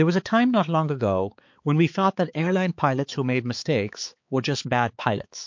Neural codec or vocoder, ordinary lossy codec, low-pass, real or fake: codec, 16 kHz, 4 kbps, X-Codec, HuBERT features, trained on balanced general audio; MP3, 48 kbps; 7.2 kHz; fake